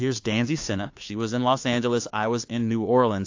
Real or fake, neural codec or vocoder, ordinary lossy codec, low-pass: fake; codec, 16 kHz, 2 kbps, FunCodec, trained on Chinese and English, 25 frames a second; AAC, 48 kbps; 7.2 kHz